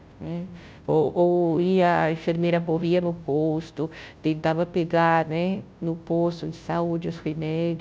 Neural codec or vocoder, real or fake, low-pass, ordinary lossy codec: codec, 16 kHz, 0.5 kbps, FunCodec, trained on Chinese and English, 25 frames a second; fake; none; none